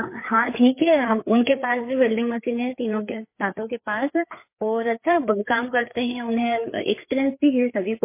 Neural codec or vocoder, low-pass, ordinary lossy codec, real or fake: codec, 16 kHz, 4 kbps, FreqCodec, larger model; 3.6 kHz; MP3, 24 kbps; fake